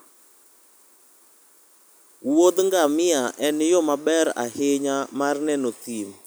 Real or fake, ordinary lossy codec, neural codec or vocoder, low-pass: real; none; none; none